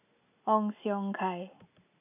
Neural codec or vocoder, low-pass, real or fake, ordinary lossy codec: none; 3.6 kHz; real; none